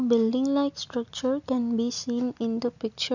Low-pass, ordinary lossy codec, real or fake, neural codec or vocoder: 7.2 kHz; none; real; none